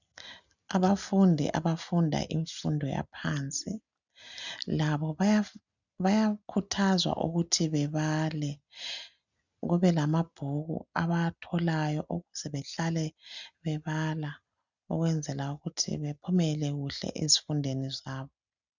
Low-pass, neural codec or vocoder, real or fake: 7.2 kHz; none; real